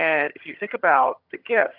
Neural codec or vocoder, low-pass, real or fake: vocoder, 22.05 kHz, 80 mel bands, HiFi-GAN; 5.4 kHz; fake